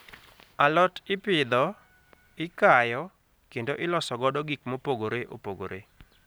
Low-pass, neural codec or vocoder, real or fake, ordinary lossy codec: none; none; real; none